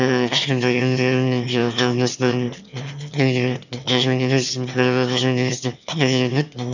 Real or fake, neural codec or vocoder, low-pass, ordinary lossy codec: fake; autoencoder, 22.05 kHz, a latent of 192 numbers a frame, VITS, trained on one speaker; 7.2 kHz; none